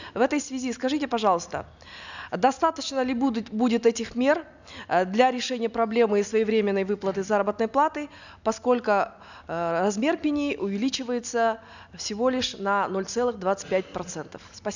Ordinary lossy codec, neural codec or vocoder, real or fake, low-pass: none; none; real; 7.2 kHz